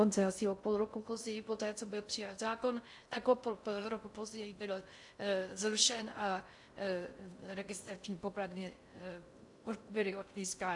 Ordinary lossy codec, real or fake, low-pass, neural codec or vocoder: AAC, 64 kbps; fake; 10.8 kHz; codec, 16 kHz in and 24 kHz out, 0.6 kbps, FocalCodec, streaming, 2048 codes